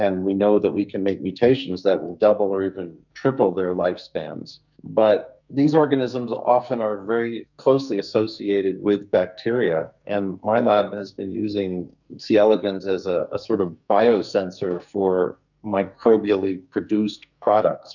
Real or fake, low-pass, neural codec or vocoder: fake; 7.2 kHz; codec, 44.1 kHz, 2.6 kbps, SNAC